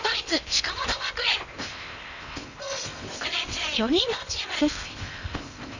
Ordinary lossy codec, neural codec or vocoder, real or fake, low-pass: none; codec, 16 kHz in and 24 kHz out, 0.8 kbps, FocalCodec, streaming, 65536 codes; fake; 7.2 kHz